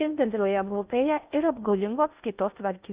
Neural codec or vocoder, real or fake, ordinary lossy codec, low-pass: codec, 16 kHz in and 24 kHz out, 0.6 kbps, FocalCodec, streaming, 2048 codes; fake; Opus, 64 kbps; 3.6 kHz